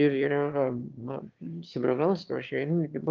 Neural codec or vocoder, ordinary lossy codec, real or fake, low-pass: autoencoder, 22.05 kHz, a latent of 192 numbers a frame, VITS, trained on one speaker; Opus, 32 kbps; fake; 7.2 kHz